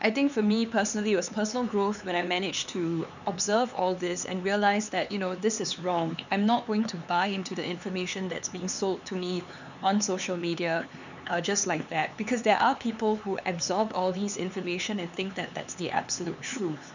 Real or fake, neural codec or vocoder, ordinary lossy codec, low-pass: fake; codec, 16 kHz, 4 kbps, X-Codec, HuBERT features, trained on LibriSpeech; none; 7.2 kHz